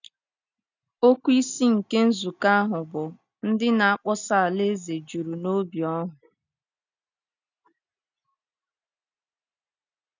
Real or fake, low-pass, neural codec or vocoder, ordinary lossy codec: real; 7.2 kHz; none; none